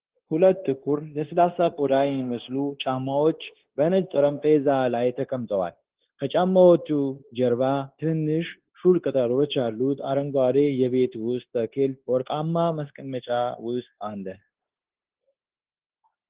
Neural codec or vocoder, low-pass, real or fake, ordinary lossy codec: codec, 16 kHz, 0.9 kbps, LongCat-Audio-Codec; 3.6 kHz; fake; Opus, 16 kbps